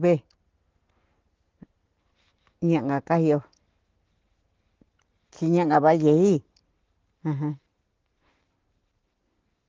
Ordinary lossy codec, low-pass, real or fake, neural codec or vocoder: Opus, 16 kbps; 7.2 kHz; real; none